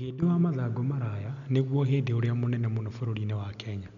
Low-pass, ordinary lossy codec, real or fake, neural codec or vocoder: 7.2 kHz; none; real; none